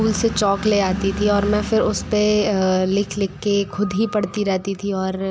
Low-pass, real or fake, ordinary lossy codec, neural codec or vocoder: none; real; none; none